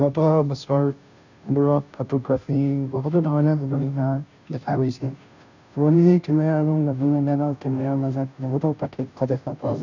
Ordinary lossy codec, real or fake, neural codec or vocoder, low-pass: none; fake; codec, 16 kHz, 0.5 kbps, FunCodec, trained on Chinese and English, 25 frames a second; 7.2 kHz